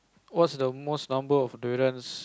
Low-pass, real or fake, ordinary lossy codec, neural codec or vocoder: none; real; none; none